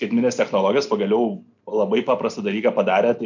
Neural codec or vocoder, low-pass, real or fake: none; 7.2 kHz; real